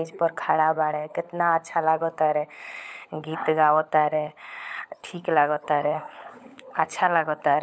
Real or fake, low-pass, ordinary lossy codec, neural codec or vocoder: fake; none; none; codec, 16 kHz, 16 kbps, FunCodec, trained on LibriTTS, 50 frames a second